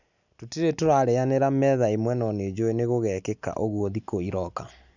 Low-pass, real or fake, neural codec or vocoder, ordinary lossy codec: 7.2 kHz; real; none; none